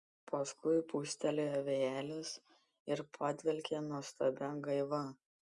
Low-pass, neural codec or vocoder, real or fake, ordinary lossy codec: 10.8 kHz; none; real; Opus, 64 kbps